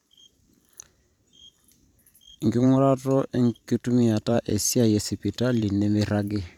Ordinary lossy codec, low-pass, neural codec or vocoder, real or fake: none; 19.8 kHz; vocoder, 44.1 kHz, 128 mel bands every 512 samples, BigVGAN v2; fake